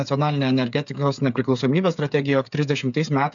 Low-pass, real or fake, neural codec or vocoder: 7.2 kHz; fake; codec, 16 kHz, 8 kbps, FreqCodec, smaller model